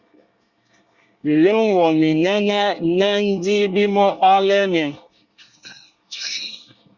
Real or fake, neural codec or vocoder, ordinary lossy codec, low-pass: fake; codec, 24 kHz, 1 kbps, SNAC; Opus, 64 kbps; 7.2 kHz